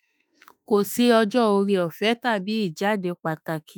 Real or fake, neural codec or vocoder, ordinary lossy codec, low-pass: fake; autoencoder, 48 kHz, 32 numbers a frame, DAC-VAE, trained on Japanese speech; none; none